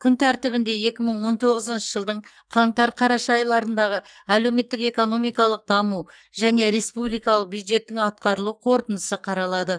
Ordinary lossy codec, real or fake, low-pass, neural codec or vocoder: none; fake; 9.9 kHz; codec, 44.1 kHz, 2.6 kbps, SNAC